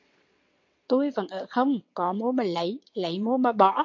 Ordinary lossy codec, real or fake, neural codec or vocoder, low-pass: MP3, 48 kbps; fake; vocoder, 22.05 kHz, 80 mel bands, WaveNeXt; 7.2 kHz